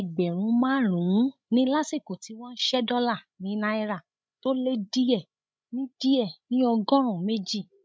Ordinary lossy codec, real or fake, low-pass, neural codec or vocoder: none; fake; none; codec, 16 kHz, 16 kbps, FreqCodec, larger model